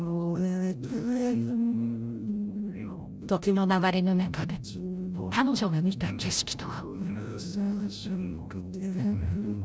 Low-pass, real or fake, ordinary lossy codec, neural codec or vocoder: none; fake; none; codec, 16 kHz, 0.5 kbps, FreqCodec, larger model